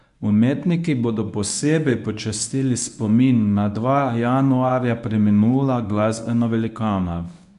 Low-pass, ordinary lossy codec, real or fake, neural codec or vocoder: 10.8 kHz; none; fake; codec, 24 kHz, 0.9 kbps, WavTokenizer, medium speech release version 1